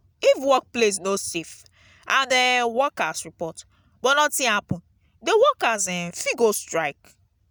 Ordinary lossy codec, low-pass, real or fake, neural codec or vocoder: none; none; real; none